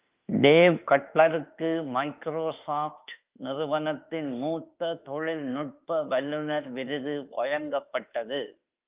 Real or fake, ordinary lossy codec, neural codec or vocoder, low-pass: fake; Opus, 24 kbps; autoencoder, 48 kHz, 32 numbers a frame, DAC-VAE, trained on Japanese speech; 3.6 kHz